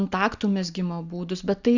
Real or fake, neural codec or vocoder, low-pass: real; none; 7.2 kHz